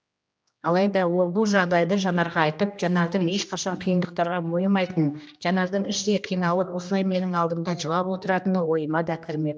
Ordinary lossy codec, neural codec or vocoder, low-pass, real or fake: none; codec, 16 kHz, 1 kbps, X-Codec, HuBERT features, trained on general audio; none; fake